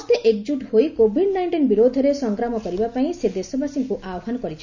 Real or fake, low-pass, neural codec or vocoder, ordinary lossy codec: real; 7.2 kHz; none; none